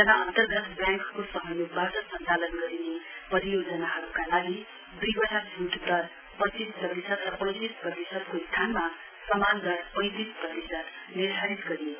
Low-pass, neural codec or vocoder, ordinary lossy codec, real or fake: 3.6 kHz; none; none; real